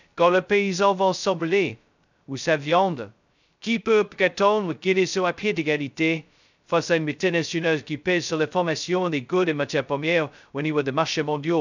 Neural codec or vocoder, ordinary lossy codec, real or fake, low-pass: codec, 16 kHz, 0.2 kbps, FocalCodec; none; fake; 7.2 kHz